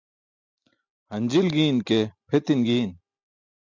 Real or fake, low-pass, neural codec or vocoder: real; 7.2 kHz; none